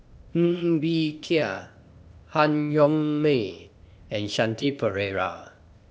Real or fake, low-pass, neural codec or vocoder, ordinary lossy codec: fake; none; codec, 16 kHz, 0.8 kbps, ZipCodec; none